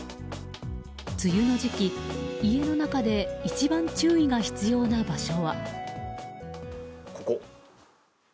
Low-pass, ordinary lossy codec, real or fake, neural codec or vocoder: none; none; real; none